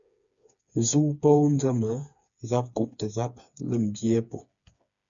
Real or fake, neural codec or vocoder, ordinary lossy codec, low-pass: fake; codec, 16 kHz, 4 kbps, FreqCodec, smaller model; MP3, 64 kbps; 7.2 kHz